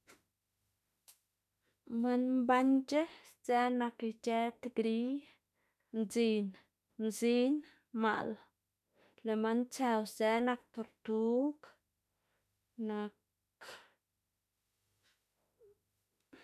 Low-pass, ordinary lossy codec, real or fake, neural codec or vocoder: 14.4 kHz; none; fake; autoencoder, 48 kHz, 32 numbers a frame, DAC-VAE, trained on Japanese speech